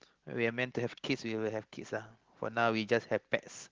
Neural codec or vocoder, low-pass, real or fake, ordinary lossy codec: none; 7.2 kHz; real; Opus, 16 kbps